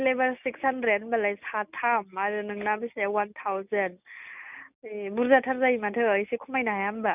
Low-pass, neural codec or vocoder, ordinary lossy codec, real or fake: 3.6 kHz; none; none; real